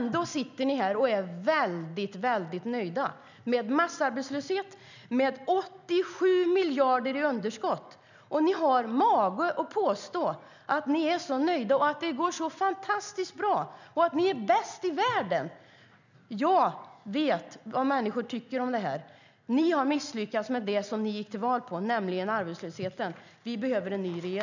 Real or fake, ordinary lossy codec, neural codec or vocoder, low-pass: real; none; none; 7.2 kHz